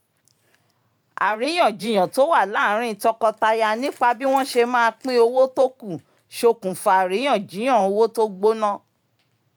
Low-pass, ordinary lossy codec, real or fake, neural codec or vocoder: 19.8 kHz; none; fake; vocoder, 44.1 kHz, 128 mel bands every 512 samples, BigVGAN v2